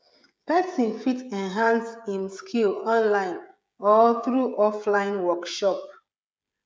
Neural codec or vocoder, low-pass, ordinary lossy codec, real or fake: codec, 16 kHz, 16 kbps, FreqCodec, smaller model; none; none; fake